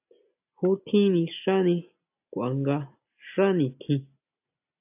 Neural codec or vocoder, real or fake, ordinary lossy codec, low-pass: none; real; AAC, 32 kbps; 3.6 kHz